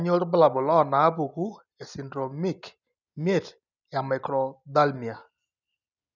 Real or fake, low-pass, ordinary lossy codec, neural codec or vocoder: real; 7.2 kHz; none; none